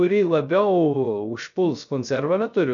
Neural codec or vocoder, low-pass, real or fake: codec, 16 kHz, 0.3 kbps, FocalCodec; 7.2 kHz; fake